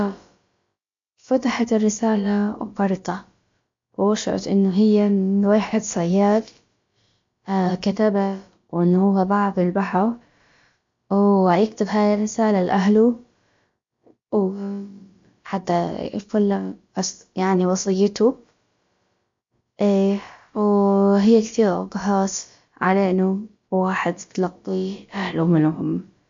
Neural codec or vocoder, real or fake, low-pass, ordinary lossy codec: codec, 16 kHz, about 1 kbps, DyCAST, with the encoder's durations; fake; 7.2 kHz; MP3, 48 kbps